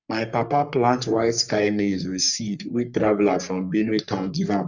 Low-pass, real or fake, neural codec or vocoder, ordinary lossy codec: 7.2 kHz; fake; codec, 44.1 kHz, 3.4 kbps, Pupu-Codec; none